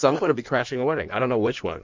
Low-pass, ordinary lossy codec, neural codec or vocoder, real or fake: 7.2 kHz; MP3, 64 kbps; codec, 16 kHz in and 24 kHz out, 1.1 kbps, FireRedTTS-2 codec; fake